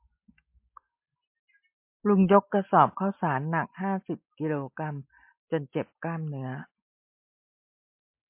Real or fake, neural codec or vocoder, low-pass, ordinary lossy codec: real; none; 3.6 kHz; MP3, 24 kbps